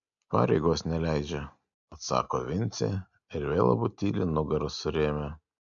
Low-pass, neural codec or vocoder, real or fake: 7.2 kHz; none; real